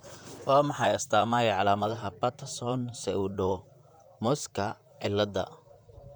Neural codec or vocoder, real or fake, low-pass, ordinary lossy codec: vocoder, 44.1 kHz, 128 mel bands, Pupu-Vocoder; fake; none; none